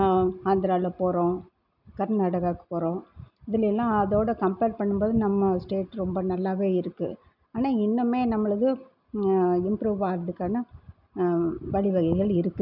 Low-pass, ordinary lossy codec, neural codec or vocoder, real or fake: 5.4 kHz; none; none; real